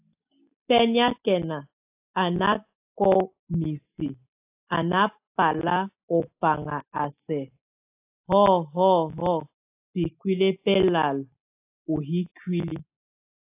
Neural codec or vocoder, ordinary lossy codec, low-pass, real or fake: none; AAC, 32 kbps; 3.6 kHz; real